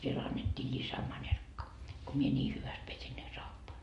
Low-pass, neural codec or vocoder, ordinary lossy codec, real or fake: 14.4 kHz; none; MP3, 48 kbps; real